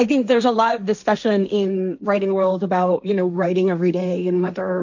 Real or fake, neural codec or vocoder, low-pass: fake; codec, 16 kHz, 1.1 kbps, Voila-Tokenizer; 7.2 kHz